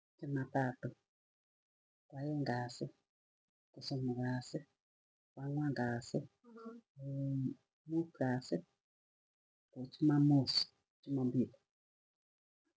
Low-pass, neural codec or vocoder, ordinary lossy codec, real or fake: none; none; none; real